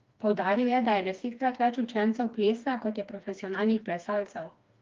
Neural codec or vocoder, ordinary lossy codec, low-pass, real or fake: codec, 16 kHz, 2 kbps, FreqCodec, smaller model; Opus, 32 kbps; 7.2 kHz; fake